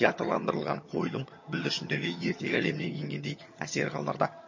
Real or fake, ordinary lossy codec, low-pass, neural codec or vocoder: fake; MP3, 32 kbps; 7.2 kHz; vocoder, 22.05 kHz, 80 mel bands, HiFi-GAN